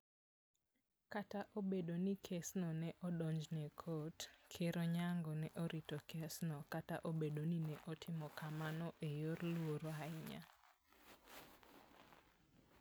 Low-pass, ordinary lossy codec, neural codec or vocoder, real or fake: none; none; none; real